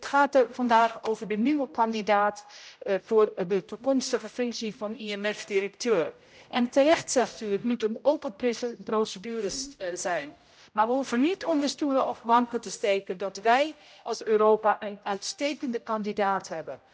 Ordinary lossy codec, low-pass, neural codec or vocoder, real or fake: none; none; codec, 16 kHz, 0.5 kbps, X-Codec, HuBERT features, trained on general audio; fake